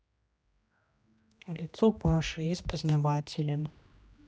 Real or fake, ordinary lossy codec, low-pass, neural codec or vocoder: fake; none; none; codec, 16 kHz, 1 kbps, X-Codec, HuBERT features, trained on general audio